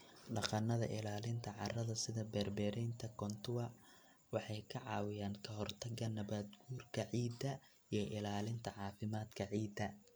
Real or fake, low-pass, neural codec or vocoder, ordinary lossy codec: real; none; none; none